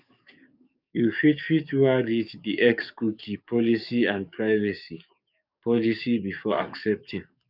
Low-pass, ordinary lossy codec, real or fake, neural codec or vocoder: 5.4 kHz; none; fake; codec, 44.1 kHz, 7.8 kbps, DAC